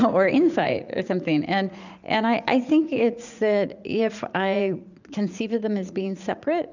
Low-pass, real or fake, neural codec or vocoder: 7.2 kHz; fake; vocoder, 44.1 kHz, 80 mel bands, Vocos